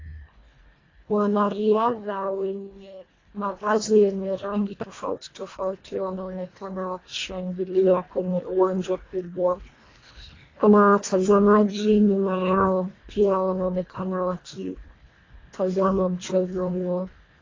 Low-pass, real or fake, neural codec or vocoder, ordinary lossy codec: 7.2 kHz; fake; codec, 24 kHz, 1.5 kbps, HILCodec; AAC, 32 kbps